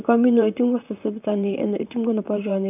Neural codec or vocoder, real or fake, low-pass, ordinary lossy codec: vocoder, 44.1 kHz, 128 mel bands, Pupu-Vocoder; fake; 3.6 kHz; none